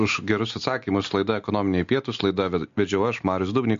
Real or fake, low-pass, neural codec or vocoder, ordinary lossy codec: real; 7.2 kHz; none; MP3, 48 kbps